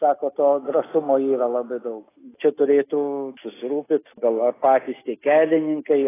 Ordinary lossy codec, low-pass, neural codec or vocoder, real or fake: AAC, 16 kbps; 3.6 kHz; none; real